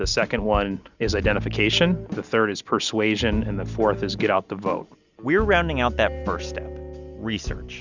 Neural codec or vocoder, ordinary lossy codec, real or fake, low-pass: none; Opus, 64 kbps; real; 7.2 kHz